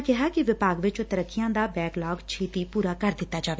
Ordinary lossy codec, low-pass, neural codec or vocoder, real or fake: none; none; none; real